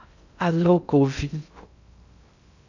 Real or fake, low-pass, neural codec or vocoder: fake; 7.2 kHz; codec, 16 kHz in and 24 kHz out, 0.6 kbps, FocalCodec, streaming, 2048 codes